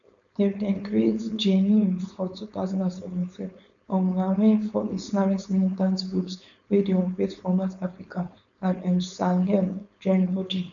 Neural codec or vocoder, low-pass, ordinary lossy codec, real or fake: codec, 16 kHz, 4.8 kbps, FACodec; 7.2 kHz; none; fake